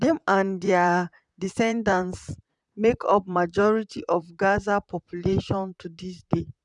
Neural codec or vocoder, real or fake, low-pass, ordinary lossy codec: vocoder, 44.1 kHz, 128 mel bands, Pupu-Vocoder; fake; 10.8 kHz; none